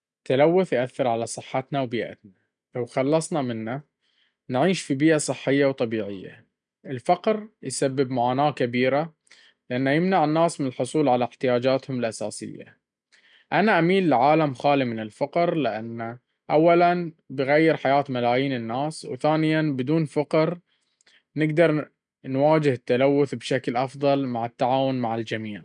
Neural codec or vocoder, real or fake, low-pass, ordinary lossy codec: none; real; 9.9 kHz; none